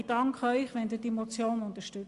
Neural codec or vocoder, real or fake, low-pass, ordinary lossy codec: none; real; 10.8 kHz; Opus, 64 kbps